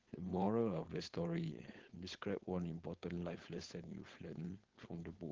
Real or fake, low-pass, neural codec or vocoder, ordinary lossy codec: fake; 7.2 kHz; codec, 16 kHz, 4.8 kbps, FACodec; Opus, 16 kbps